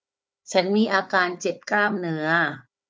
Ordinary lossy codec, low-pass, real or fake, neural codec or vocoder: none; none; fake; codec, 16 kHz, 4 kbps, FunCodec, trained on Chinese and English, 50 frames a second